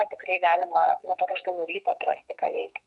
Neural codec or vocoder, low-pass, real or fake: codec, 44.1 kHz, 2.6 kbps, SNAC; 10.8 kHz; fake